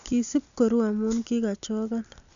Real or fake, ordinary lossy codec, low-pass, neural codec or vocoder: real; none; 7.2 kHz; none